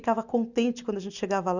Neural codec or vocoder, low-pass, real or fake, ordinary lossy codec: none; 7.2 kHz; real; none